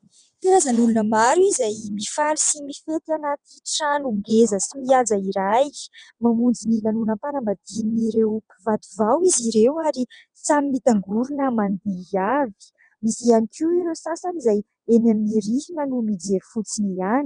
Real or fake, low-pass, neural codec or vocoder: fake; 9.9 kHz; vocoder, 22.05 kHz, 80 mel bands, WaveNeXt